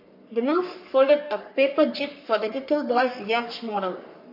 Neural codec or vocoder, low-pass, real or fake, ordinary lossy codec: codec, 44.1 kHz, 3.4 kbps, Pupu-Codec; 5.4 kHz; fake; MP3, 32 kbps